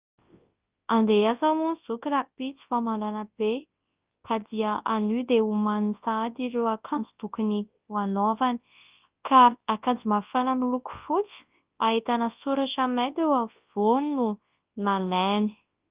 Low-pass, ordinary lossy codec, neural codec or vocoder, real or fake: 3.6 kHz; Opus, 32 kbps; codec, 24 kHz, 0.9 kbps, WavTokenizer, large speech release; fake